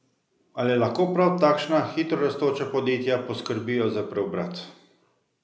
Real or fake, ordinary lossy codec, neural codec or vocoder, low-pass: real; none; none; none